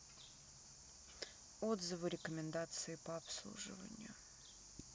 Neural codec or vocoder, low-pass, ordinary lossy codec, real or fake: none; none; none; real